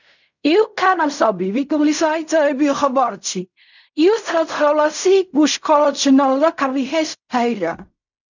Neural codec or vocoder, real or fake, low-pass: codec, 16 kHz in and 24 kHz out, 0.4 kbps, LongCat-Audio-Codec, fine tuned four codebook decoder; fake; 7.2 kHz